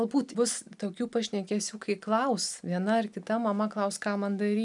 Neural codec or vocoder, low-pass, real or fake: none; 10.8 kHz; real